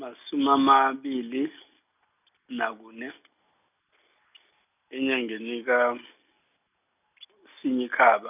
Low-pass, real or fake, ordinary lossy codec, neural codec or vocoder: 3.6 kHz; real; none; none